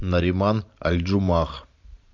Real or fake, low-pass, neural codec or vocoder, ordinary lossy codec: fake; 7.2 kHz; vocoder, 44.1 kHz, 128 mel bands every 512 samples, BigVGAN v2; AAC, 48 kbps